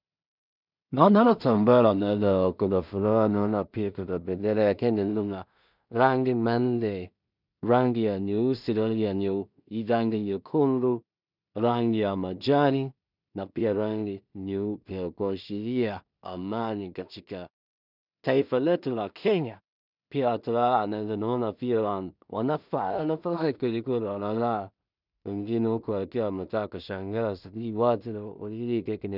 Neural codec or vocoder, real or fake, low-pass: codec, 16 kHz in and 24 kHz out, 0.4 kbps, LongCat-Audio-Codec, two codebook decoder; fake; 5.4 kHz